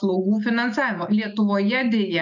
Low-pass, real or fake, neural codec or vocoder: 7.2 kHz; real; none